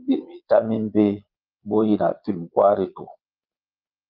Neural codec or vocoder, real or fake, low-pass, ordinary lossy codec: vocoder, 22.05 kHz, 80 mel bands, Vocos; fake; 5.4 kHz; Opus, 32 kbps